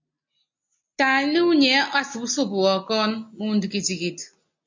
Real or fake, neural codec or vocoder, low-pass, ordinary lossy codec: real; none; 7.2 kHz; MP3, 48 kbps